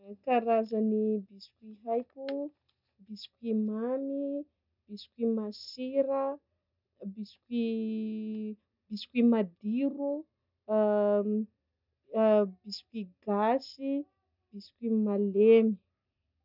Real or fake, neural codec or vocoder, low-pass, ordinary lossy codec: real; none; 5.4 kHz; none